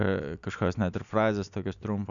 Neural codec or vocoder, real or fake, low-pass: none; real; 7.2 kHz